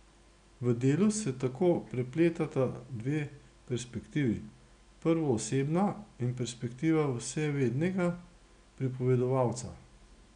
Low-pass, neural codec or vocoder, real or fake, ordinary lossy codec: 9.9 kHz; none; real; none